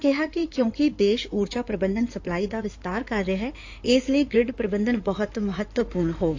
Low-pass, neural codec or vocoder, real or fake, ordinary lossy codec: 7.2 kHz; codec, 16 kHz in and 24 kHz out, 2.2 kbps, FireRedTTS-2 codec; fake; AAC, 48 kbps